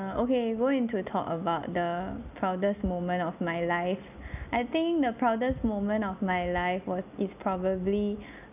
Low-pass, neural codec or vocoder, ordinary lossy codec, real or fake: 3.6 kHz; none; none; real